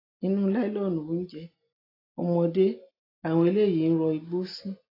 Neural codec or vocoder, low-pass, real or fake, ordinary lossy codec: none; 5.4 kHz; real; AAC, 24 kbps